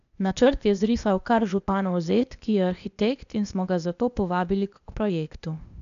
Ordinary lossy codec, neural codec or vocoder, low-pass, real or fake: none; codec, 16 kHz, 0.8 kbps, ZipCodec; 7.2 kHz; fake